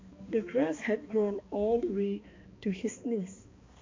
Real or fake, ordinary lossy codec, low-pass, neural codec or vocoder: fake; MP3, 48 kbps; 7.2 kHz; codec, 16 kHz, 2 kbps, X-Codec, HuBERT features, trained on balanced general audio